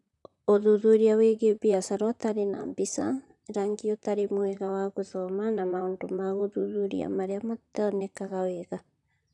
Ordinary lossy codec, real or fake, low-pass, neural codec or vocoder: none; fake; 10.8 kHz; vocoder, 44.1 kHz, 128 mel bands, Pupu-Vocoder